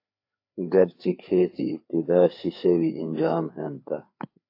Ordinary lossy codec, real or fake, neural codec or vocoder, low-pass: AAC, 32 kbps; fake; codec, 16 kHz, 4 kbps, FreqCodec, larger model; 5.4 kHz